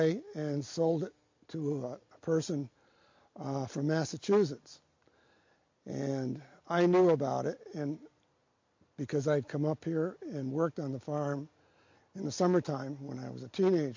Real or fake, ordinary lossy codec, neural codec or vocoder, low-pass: real; MP3, 48 kbps; none; 7.2 kHz